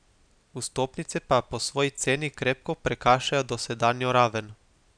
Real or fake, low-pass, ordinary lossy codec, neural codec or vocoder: real; 9.9 kHz; none; none